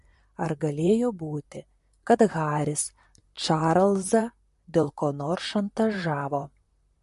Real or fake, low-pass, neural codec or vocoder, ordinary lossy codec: fake; 14.4 kHz; vocoder, 44.1 kHz, 128 mel bands, Pupu-Vocoder; MP3, 48 kbps